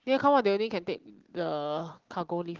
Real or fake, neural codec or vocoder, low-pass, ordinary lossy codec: real; none; 7.2 kHz; Opus, 16 kbps